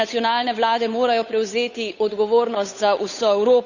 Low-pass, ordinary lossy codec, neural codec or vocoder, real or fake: 7.2 kHz; none; codec, 16 kHz, 8 kbps, FunCodec, trained on Chinese and English, 25 frames a second; fake